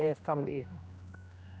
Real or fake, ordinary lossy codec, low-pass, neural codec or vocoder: fake; none; none; codec, 16 kHz, 1 kbps, X-Codec, HuBERT features, trained on general audio